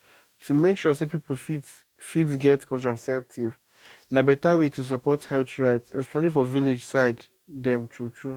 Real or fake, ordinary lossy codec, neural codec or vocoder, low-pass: fake; none; codec, 44.1 kHz, 2.6 kbps, DAC; 19.8 kHz